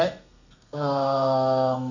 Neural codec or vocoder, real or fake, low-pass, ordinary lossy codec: codec, 32 kHz, 1.9 kbps, SNAC; fake; 7.2 kHz; none